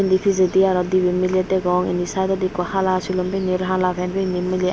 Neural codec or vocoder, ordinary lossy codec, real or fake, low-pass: none; none; real; none